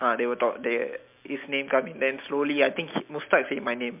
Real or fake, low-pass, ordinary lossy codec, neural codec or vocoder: real; 3.6 kHz; MP3, 32 kbps; none